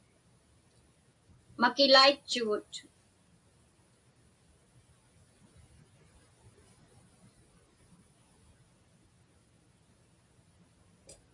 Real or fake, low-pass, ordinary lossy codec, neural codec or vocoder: real; 10.8 kHz; AAC, 64 kbps; none